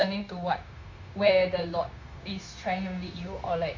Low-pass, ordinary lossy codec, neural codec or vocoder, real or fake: 7.2 kHz; MP3, 48 kbps; codec, 16 kHz, 0.9 kbps, LongCat-Audio-Codec; fake